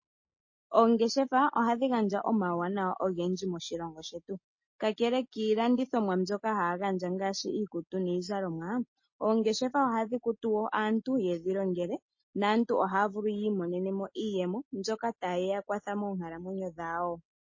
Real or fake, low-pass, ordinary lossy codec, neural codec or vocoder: real; 7.2 kHz; MP3, 32 kbps; none